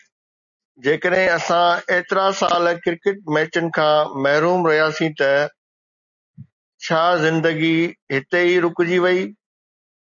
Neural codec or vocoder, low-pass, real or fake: none; 7.2 kHz; real